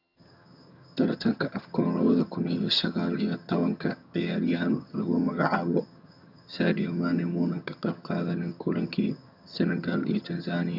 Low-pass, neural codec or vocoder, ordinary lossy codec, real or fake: 5.4 kHz; vocoder, 22.05 kHz, 80 mel bands, HiFi-GAN; none; fake